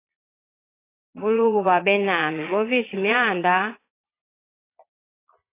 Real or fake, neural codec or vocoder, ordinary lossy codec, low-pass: fake; vocoder, 22.05 kHz, 80 mel bands, Vocos; AAC, 24 kbps; 3.6 kHz